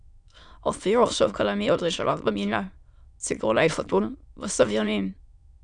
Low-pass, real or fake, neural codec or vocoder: 9.9 kHz; fake; autoencoder, 22.05 kHz, a latent of 192 numbers a frame, VITS, trained on many speakers